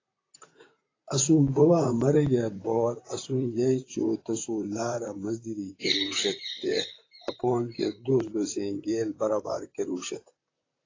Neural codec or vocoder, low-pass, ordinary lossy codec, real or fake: vocoder, 44.1 kHz, 128 mel bands, Pupu-Vocoder; 7.2 kHz; AAC, 32 kbps; fake